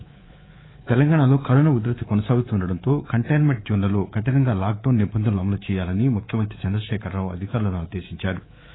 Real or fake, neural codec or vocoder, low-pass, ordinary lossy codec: fake; codec, 24 kHz, 3.1 kbps, DualCodec; 7.2 kHz; AAC, 16 kbps